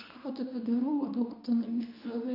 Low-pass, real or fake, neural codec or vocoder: 5.4 kHz; fake; codec, 24 kHz, 0.9 kbps, WavTokenizer, medium speech release version 2